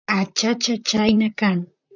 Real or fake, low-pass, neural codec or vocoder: fake; 7.2 kHz; vocoder, 44.1 kHz, 128 mel bands, Pupu-Vocoder